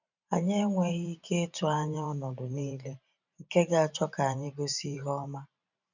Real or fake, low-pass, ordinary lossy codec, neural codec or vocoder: fake; 7.2 kHz; none; vocoder, 44.1 kHz, 128 mel bands every 512 samples, BigVGAN v2